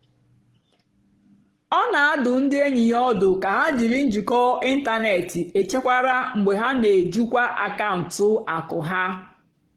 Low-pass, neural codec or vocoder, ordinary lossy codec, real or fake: 19.8 kHz; codec, 44.1 kHz, 7.8 kbps, Pupu-Codec; Opus, 16 kbps; fake